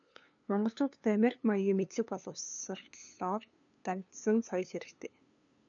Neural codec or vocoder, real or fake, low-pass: codec, 16 kHz, 2 kbps, FunCodec, trained on LibriTTS, 25 frames a second; fake; 7.2 kHz